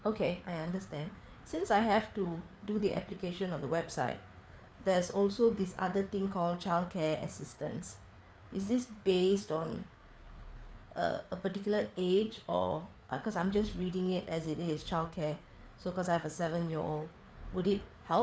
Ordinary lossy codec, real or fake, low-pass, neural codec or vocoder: none; fake; none; codec, 16 kHz, 4 kbps, FreqCodec, larger model